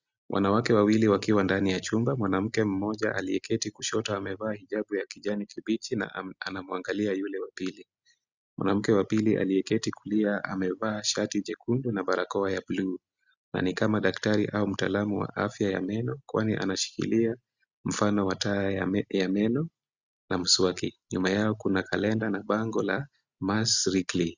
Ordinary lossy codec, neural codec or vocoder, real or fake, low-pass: Opus, 64 kbps; none; real; 7.2 kHz